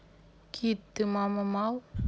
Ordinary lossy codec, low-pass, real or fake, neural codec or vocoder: none; none; real; none